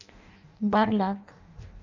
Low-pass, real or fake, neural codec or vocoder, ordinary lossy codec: 7.2 kHz; fake; codec, 16 kHz in and 24 kHz out, 0.6 kbps, FireRedTTS-2 codec; none